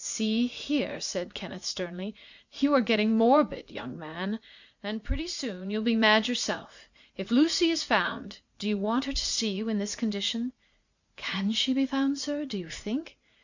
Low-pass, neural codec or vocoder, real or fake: 7.2 kHz; vocoder, 44.1 kHz, 80 mel bands, Vocos; fake